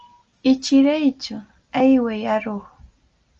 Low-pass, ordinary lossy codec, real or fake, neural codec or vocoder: 7.2 kHz; Opus, 24 kbps; real; none